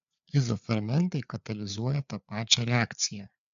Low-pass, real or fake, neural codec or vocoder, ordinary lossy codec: 7.2 kHz; fake; codec, 16 kHz, 4 kbps, FreqCodec, larger model; AAC, 96 kbps